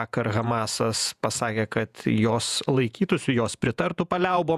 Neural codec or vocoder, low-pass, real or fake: vocoder, 48 kHz, 128 mel bands, Vocos; 14.4 kHz; fake